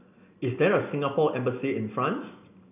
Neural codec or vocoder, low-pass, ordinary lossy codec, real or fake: none; 3.6 kHz; none; real